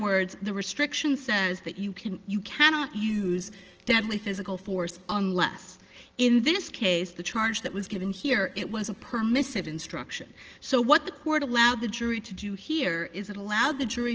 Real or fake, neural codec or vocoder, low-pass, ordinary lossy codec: real; none; 7.2 kHz; Opus, 16 kbps